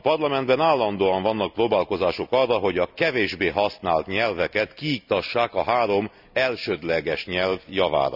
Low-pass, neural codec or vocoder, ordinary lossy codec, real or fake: 5.4 kHz; none; none; real